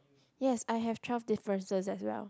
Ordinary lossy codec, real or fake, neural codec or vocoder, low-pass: none; real; none; none